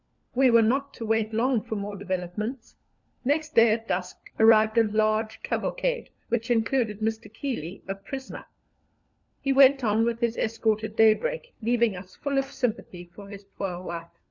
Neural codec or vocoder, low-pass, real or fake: codec, 16 kHz, 4 kbps, FunCodec, trained on LibriTTS, 50 frames a second; 7.2 kHz; fake